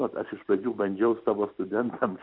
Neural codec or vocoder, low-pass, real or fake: none; 5.4 kHz; real